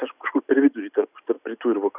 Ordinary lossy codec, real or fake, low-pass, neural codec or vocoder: Opus, 64 kbps; real; 3.6 kHz; none